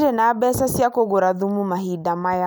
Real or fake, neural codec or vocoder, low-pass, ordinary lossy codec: real; none; none; none